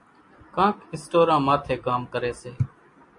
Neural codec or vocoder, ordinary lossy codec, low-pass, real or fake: none; MP3, 48 kbps; 10.8 kHz; real